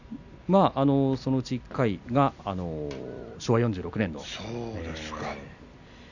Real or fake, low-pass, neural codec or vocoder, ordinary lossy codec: real; 7.2 kHz; none; none